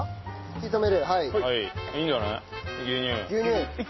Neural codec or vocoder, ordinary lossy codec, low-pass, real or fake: none; MP3, 24 kbps; 7.2 kHz; real